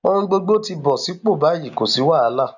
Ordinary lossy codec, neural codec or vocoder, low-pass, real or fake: none; none; 7.2 kHz; real